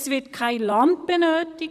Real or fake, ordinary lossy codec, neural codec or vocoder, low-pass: fake; none; vocoder, 44.1 kHz, 128 mel bands, Pupu-Vocoder; 14.4 kHz